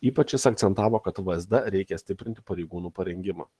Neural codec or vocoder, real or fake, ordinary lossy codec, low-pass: none; real; Opus, 16 kbps; 10.8 kHz